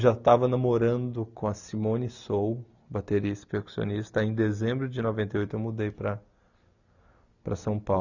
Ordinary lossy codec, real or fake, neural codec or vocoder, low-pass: none; real; none; 7.2 kHz